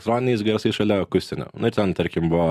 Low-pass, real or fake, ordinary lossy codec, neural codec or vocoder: 14.4 kHz; real; Opus, 64 kbps; none